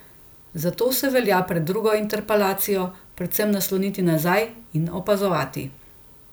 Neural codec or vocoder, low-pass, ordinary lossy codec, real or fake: none; none; none; real